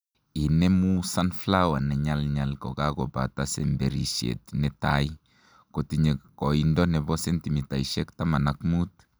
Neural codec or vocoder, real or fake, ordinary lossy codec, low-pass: none; real; none; none